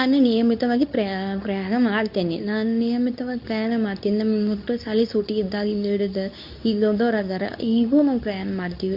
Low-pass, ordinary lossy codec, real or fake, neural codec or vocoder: 5.4 kHz; none; fake; codec, 24 kHz, 0.9 kbps, WavTokenizer, medium speech release version 2